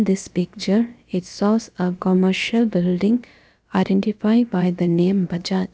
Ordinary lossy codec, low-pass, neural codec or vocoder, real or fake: none; none; codec, 16 kHz, about 1 kbps, DyCAST, with the encoder's durations; fake